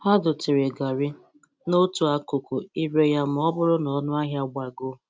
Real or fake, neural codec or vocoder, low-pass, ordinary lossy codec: real; none; none; none